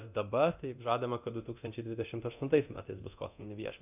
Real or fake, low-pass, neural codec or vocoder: fake; 3.6 kHz; codec, 24 kHz, 0.9 kbps, DualCodec